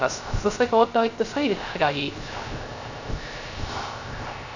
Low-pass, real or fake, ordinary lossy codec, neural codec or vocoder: 7.2 kHz; fake; none; codec, 16 kHz, 0.3 kbps, FocalCodec